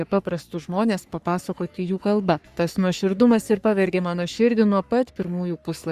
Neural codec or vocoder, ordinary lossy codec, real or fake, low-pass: codec, 44.1 kHz, 3.4 kbps, Pupu-Codec; Opus, 64 kbps; fake; 14.4 kHz